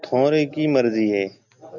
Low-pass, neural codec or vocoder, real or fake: 7.2 kHz; none; real